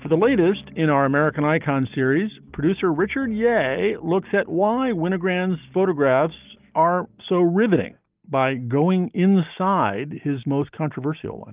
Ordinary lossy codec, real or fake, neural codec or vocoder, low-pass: Opus, 24 kbps; real; none; 3.6 kHz